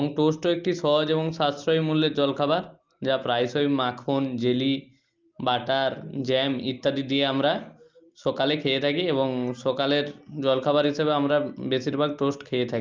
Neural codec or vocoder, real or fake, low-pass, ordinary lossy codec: none; real; 7.2 kHz; Opus, 32 kbps